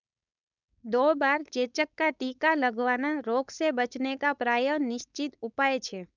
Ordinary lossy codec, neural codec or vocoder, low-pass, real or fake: none; codec, 16 kHz, 4.8 kbps, FACodec; 7.2 kHz; fake